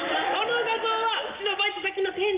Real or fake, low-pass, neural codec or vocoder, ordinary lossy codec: real; 3.6 kHz; none; Opus, 32 kbps